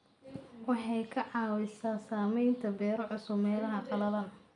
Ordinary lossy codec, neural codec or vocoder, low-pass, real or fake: none; none; 10.8 kHz; real